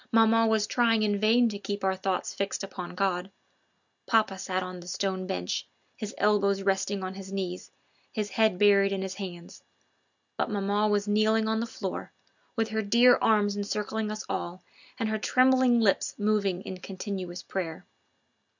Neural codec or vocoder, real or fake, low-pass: none; real; 7.2 kHz